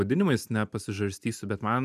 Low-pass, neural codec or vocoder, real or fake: 14.4 kHz; none; real